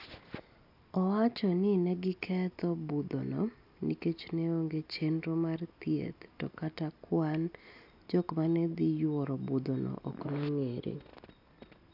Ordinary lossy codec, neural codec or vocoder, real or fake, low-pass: AAC, 48 kbps; none; real; 5.4 kHz